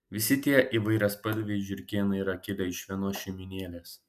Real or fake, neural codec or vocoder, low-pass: real; none; 14.4 kHz